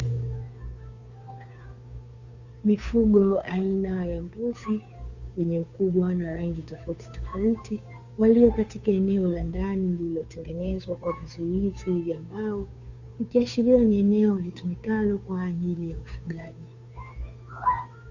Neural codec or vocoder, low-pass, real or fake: codec, 16 kHz, 2 kbps, FunCodec, trained on Chinese and English, 25 frames a second; 7.2 kHz; fake